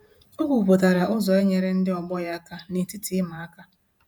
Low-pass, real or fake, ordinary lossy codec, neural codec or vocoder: none; fake; none; vocoder, 48 kHz, 128 mel bands, Vocos